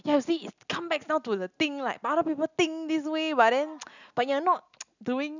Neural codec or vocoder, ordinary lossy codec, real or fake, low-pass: none; none; real; 7.2 kHz